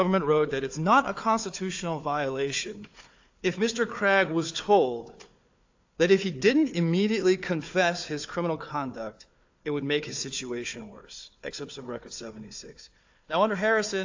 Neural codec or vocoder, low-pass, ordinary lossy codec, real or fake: codec, 16 kHz, 4 kbps, FunCodec, trained on Chinese and English, 50 frames a second; 7.2 kHz; AAC, 48 kbps; fake